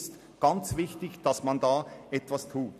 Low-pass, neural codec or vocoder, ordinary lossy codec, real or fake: 14.4 kHz; none; none; real